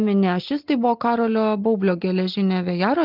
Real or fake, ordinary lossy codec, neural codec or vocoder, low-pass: real; Opus, 32 kbps; none; 5.4 kHz